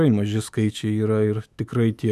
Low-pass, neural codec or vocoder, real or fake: 14.4 kHz; autoencoder, 48 kHz, 128 numbers a frame, DAC-VAE, trained on Japanese speech; fake